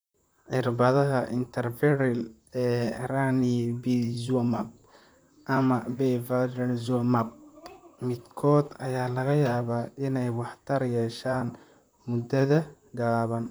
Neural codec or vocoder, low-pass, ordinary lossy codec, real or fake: vocoder, 44.1 kHz, 128 mel bands, Pupu-Vocoder; none; none; fake